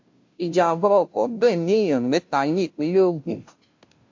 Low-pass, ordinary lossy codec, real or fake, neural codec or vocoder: 7.2 kHz; MP3, 48 kbps; fake; codec, 16 kHz, 0.5 kbps, FunCodec, trained on Chinese and English, 25 frames a second